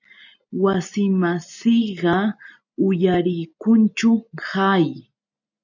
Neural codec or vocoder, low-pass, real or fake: none; 7.2 kHz; real